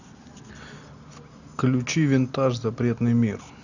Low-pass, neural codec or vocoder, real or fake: 7.2 kHz; none; real